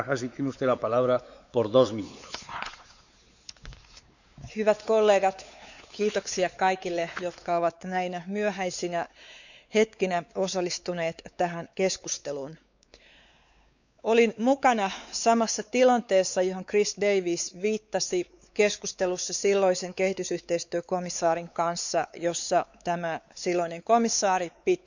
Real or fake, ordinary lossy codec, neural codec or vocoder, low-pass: fake; none; codec, 16 kHz, 4 kbps, X-Codec, WavLM features, trained on Multilingual LibriSpeech; 7.2 kHz